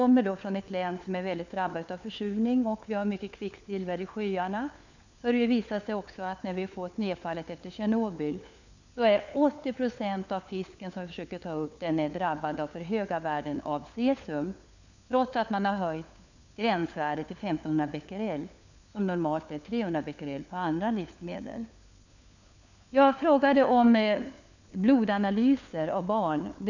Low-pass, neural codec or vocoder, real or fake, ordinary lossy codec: 7.2 kHz; codec, 16 kHz, 4 kbps, FunCodec, trained on LibriTTS, 50 frames a second; fake; none